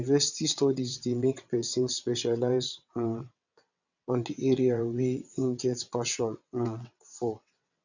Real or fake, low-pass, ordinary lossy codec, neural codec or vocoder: fake; 7.2 kHz; none; vocoder, 22.05 kHz, 80 mel bands, WaveNeXt